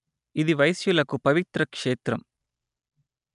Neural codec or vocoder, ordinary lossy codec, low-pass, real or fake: none; MP3, 96 kbps; 10.8 kHz; real